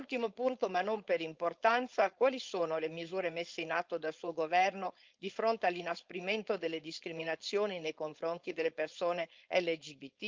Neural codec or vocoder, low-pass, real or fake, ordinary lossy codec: codec, 16 kHz, 4.8 kbps, FACodec; 7.2 kHz; fake; Opus, 24 kbps